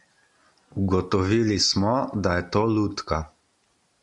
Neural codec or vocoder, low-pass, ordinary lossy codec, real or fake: none; 10.8 kHz; MP3, 96 kbps; real